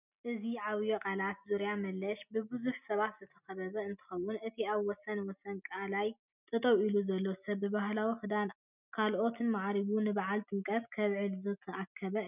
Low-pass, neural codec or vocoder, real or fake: 3.6 kHz; none; real